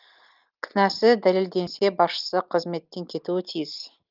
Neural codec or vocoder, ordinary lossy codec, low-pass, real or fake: none; Opus, 32 kbps; 5.4 kHz; real